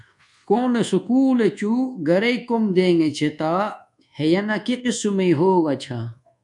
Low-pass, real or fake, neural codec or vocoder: 10.8 kHz; fake; codec, 24 kHz, 1.2 kbps, DualCodec